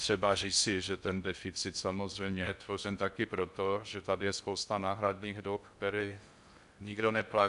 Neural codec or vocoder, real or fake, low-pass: codec, 16 kHz in and 24 kHz out, 0.6 kbps, FocalCodec, streaming, 4096 codes; fake; 10.8 kHz